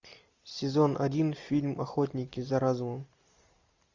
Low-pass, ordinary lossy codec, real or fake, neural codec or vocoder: 7.2 kHz; AAC, 48 kbps; real; none